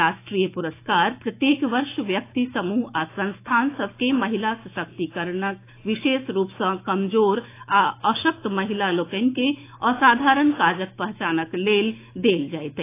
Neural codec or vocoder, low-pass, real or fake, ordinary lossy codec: autoencoder, 48 kHz, 128 numbers a frame, DAC-VAE, trained on Japanese speech; 3.6 kHz; fake; AAC, 24 kbps